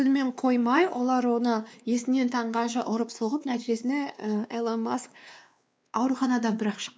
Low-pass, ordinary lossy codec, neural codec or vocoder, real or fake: none; none; codec, 16 kHz, 2 kbps, X-Codec, WavLM features, trained on Multilingual LibriSpeech; fake